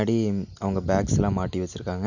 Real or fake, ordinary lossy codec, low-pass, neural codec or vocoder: real; none; 7.2 kHz; none